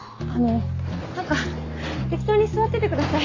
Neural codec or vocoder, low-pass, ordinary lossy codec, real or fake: none; 7.2 kHz; AAC, 32 kbps; real